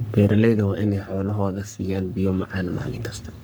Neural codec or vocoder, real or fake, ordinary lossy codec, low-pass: codec, 44.1 kHz, 3.4 kbps, Pupu-Codec; fake; none; none